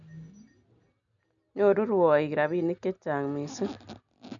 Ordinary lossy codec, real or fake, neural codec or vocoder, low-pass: none; real; none; 7.2 kHz